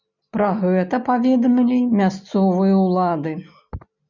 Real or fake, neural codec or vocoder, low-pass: real; none; 7.2 kHz